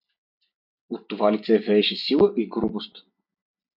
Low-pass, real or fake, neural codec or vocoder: 5.4 kHz; real; none